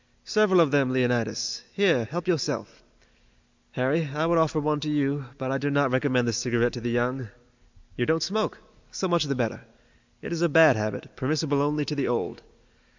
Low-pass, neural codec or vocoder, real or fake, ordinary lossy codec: 7.2 kHz; none; real; MP3, 64 kbps